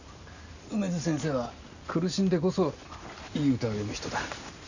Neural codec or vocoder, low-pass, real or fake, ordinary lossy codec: none; 7.2 kHz; real; none